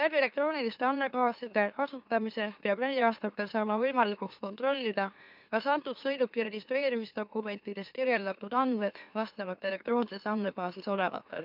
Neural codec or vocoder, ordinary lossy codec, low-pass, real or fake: autoencoder, 44.1 kHz, a latent of 192 numbers a frame, MeloTTS; none; 5.4 kHz; fake